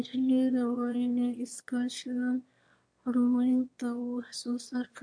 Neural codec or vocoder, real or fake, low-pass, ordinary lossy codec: autoencoder, 22.05 kHz, a latent of 192 numbers a frame, VITS, trained on one speaker; fake; 9.9 kHz; MP3, 64 kbps